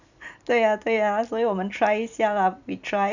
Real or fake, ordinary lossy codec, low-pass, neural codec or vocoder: fake; none; 7.2 kHz; vocoder, 44.1 kHz, 128 mel bands every 256 samples, BigVGAN v2